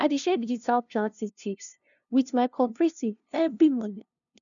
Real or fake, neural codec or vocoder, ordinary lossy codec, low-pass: fake; codec, 16 kHz, 0.5 kbps, FunCodec, trained on LibriTTS, 25 frames a second; none; 7.2 kHz